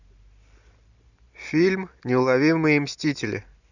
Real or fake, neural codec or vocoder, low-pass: real; none; 7.2 kHz